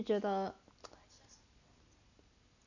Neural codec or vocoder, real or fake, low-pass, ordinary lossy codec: none; real; 7.2 kHz; none